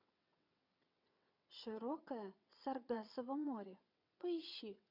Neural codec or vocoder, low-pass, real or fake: vocoder, 22.05 kHz, 80 mel bands, WaveNeXt; 5.4 kHz; fake